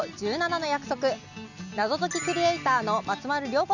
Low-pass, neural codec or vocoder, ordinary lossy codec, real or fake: 7.2 kHz; none; none; real